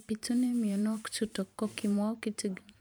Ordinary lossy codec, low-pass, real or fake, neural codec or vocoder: none; none; fake; vocoder, 44.1 kHz, 128 mel bands every 512 samples, BigVGAN v2